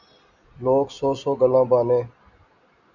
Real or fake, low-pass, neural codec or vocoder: real; 7.2 kHz; none